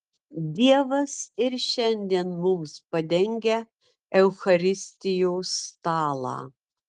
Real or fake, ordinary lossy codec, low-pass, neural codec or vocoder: real; Opus, 24 kbps; 10.8 kHz; none